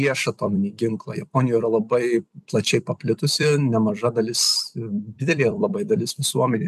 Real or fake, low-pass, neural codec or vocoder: fake; 14.4 kHz; vocoder, 44.1 kHz, 128 mel bands every 256 samples, BigVGAN v2